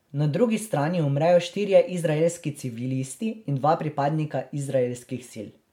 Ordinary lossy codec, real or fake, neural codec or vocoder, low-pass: MP3, 96 kbps; real; none; 19.8 kHz